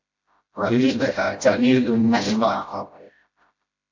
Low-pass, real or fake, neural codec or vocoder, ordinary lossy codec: 7.2 kHz; fake; codec, 16 kHz, 0.5 kbps, FreqCodec, smaller model; MP3, 48 kbps